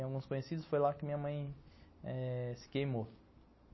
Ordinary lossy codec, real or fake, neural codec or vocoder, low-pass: MP3, 24 kbps; real; none; 7.2 kHz